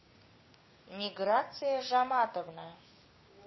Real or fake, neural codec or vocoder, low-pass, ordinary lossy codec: fake; codec, 16 kHz, 6 kbps, DAC; 7.2 kHz; MP3, 24 kbps